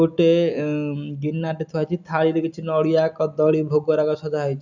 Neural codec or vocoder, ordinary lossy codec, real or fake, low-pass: none; none; real; 7.2 kHz